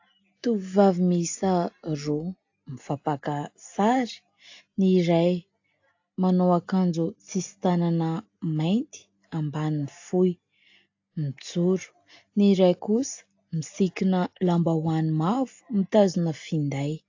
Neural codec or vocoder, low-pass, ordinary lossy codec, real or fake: none; 7.2 kHz; AAC, 48 kbps; real